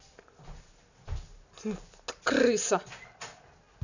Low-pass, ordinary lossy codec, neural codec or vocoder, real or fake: 7.2 kHz; none; none; real